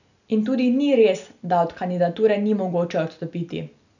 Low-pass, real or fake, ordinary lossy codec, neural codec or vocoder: 7.2 kHz; real; none; none